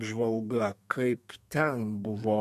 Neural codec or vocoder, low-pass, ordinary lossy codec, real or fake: codec, 32 kHz, 1.9 kbps, SNAC; 14.4 kHz; MP3, 64 kbps; fake